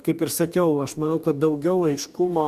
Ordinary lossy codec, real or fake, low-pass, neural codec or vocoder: MP3, 96 kbps; fake; 14.4 kHz; codec, 44.1 kHz, 2.6 kbps, DAC